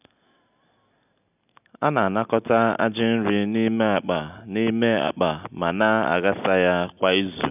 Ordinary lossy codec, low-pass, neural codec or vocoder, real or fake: none; 3.6 kHz; none; real